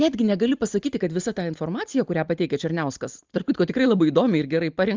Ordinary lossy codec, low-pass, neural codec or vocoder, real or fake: Opus, 32 kbps; 7.2 kHz; none; real